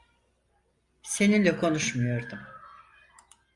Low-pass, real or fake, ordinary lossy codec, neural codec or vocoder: 10.8 kHz; real; Opus, 64 kbps; none